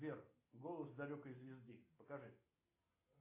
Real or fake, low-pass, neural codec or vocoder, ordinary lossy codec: real; 3.6 kHz; none; AAC, 32 kbps